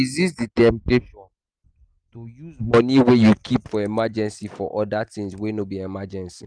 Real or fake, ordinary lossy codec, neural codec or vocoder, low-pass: real; Opus, 32 kbps; none; 9.9 kHz